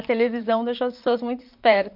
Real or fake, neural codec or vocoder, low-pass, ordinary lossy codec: fake; vocoder, 44.1 kHz, 128 mel bands, Pupu-Vocoder; 5.4 kHz; MP3, 48 kbps